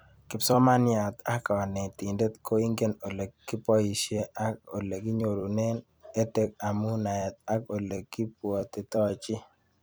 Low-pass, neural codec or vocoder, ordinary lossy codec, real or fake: none; vocoder, 44.1 kHz, 128 mel bands every 256 samples, BigVGAN v2; none; fake